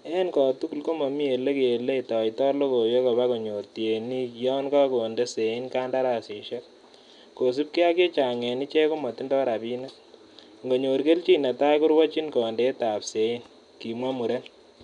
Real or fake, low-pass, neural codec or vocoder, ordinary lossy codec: real; 10.8 kHz; none; none